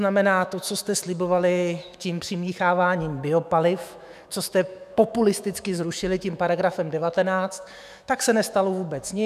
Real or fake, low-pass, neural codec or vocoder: fake; 14.4 kHz; autoencoder, 48 kHz, 128 numbers a frame, DAC-VAE, trained on Japanese speech